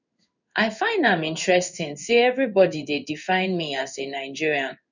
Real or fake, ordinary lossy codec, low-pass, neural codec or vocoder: fake; none; 7.2 kHz; codec, 16 kHz in and 24 kHz out, 1 kbps, XY-Tokenizer